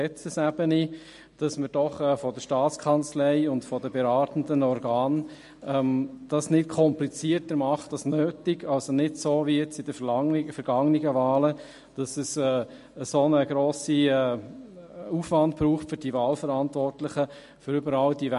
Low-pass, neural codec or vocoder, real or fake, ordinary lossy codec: 14.4 kHz; none; real; MP3, 48 kbps